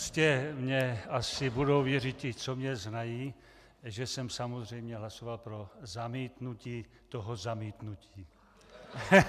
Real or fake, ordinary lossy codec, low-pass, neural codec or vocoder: real; MP3, 96 kbps; 14.4 kHz; none